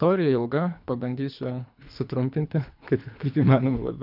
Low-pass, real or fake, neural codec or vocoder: 5.4 kHz; fake; codec, 24 kHz, 3 kbps, HILCodec